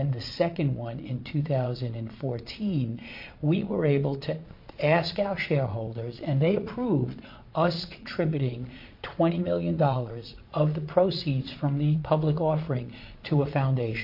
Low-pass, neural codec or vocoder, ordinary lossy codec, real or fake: 5.4 kHz; vocoder, 22.05 kHz, 80 mel bands, Vocos; MP3, 32 kbps; fake